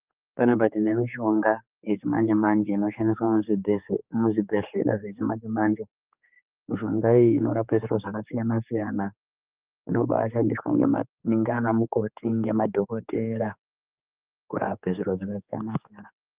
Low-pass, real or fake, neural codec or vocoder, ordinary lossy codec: 3.6 kHz; fake; codec, 16 kHz, 4 kbps, X-Codec, HuBERT features, trained on general audio; Opus, 24 kbps